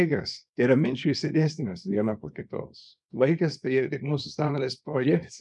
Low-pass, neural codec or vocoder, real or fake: 10.8 kHz; codec, 24 kHz, 0.9 kbps, WavTokenizer, small release; fake